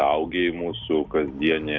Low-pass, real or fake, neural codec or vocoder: 7.2 kHz; real; none